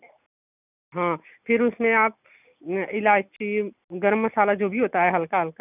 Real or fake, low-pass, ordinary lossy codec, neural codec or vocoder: real; 3.6 kHz; none; none